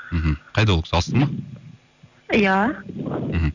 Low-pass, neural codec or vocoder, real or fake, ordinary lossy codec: 7.2 kHz; none; real; none